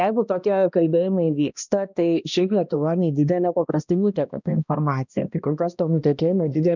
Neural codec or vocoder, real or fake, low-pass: codec, 16 kHz, 1 kbps, X-Codec, HuBERT features, trained on balanced general audio; fake; 7.2 kHz